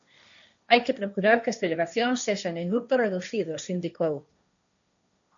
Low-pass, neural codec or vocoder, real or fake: 7.2 kHz; codec, 16 kHz, 1.1 kbps, Voila-Tokenizer; fake